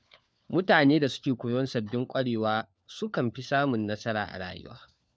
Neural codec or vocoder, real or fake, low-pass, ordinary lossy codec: codec, 16 kHz, 4 kbps, FunCodec, trained on LibriTTS, 50 frames a second; fake; none; none